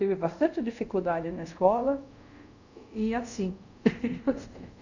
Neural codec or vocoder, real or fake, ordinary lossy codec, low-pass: codec, 24 kHz, 0.5 kbps, DualCodec; fake; none; 7.2 kHz